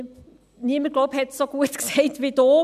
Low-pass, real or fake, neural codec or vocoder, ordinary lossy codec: 14.4 kHz; real; none; none